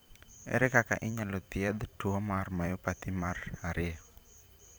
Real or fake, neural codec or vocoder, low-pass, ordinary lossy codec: fake; vocoder, 44.1 kHz, 128 mel bands every 512 samples, BigVGAN v2; none; none